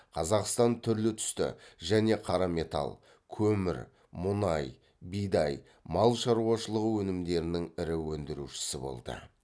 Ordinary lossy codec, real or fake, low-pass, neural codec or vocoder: none; real; none; none